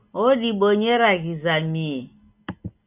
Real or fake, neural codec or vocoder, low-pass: real; none; 3.6 kHz